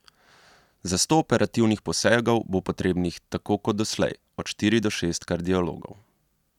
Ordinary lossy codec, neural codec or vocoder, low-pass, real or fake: none; none; 19.8 kHz; real